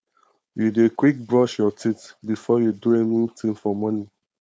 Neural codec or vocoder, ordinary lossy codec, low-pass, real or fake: codec, 16 kHz, 4.8 kbps, FACodec; none; none; fake